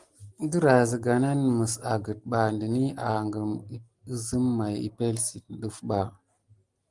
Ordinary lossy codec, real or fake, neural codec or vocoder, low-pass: Opus, 24 kbps; real; none; 10.8 kHz